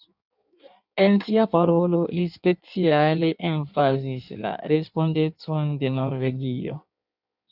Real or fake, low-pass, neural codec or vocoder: fake; 5.4 kHz; codec, 16 kHz in and 24 kHz out, 1.1 kbps, FireRedTTS-2 codec